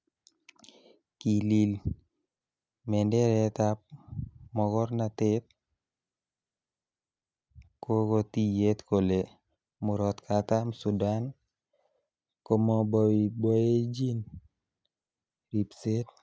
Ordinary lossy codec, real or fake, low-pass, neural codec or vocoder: none; real; none; none